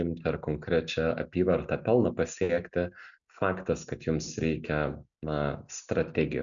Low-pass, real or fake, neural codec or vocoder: 7.2 kHz; real; none